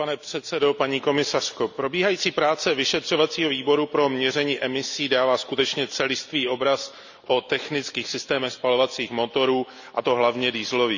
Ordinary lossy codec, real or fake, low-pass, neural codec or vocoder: none; real; 7.2 kHz; none